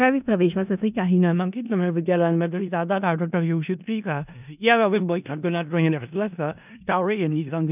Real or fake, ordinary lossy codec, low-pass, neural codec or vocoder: fake; none; 3.6 kHz; codec, 16 kHz in and 24 kHz out, 0.4 kbps, LongCat-Audio-Codec, four codebook decoder